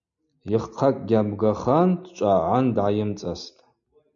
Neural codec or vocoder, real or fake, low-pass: none; real; 7.2 kHz